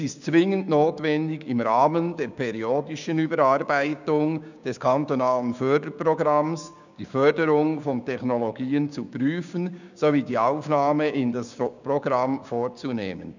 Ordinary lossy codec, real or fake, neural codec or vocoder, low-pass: none; fake; codec, 16 kHz, 6 kbps, DAC; 7.2 kHz